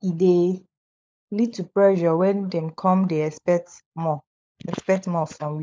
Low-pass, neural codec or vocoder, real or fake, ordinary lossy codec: none; codec, 16 kHz, 16 kbps, FunCodec, trained on LibriTTS, 50 frames a second; fake; none